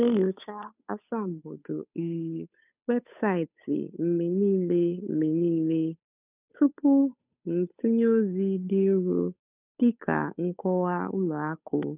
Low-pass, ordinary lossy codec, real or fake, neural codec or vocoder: 3.6 kHz; none; fake; codec, 16 kHz, 8 kbps, FunCodec, trained on Chinese and English, 25 frames a second